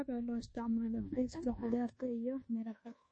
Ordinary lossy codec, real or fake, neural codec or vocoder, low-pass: MP3, 32 kbps; fake; codec, 24 kHz, 1.2 kbps, DualCodec; 9.9 kHz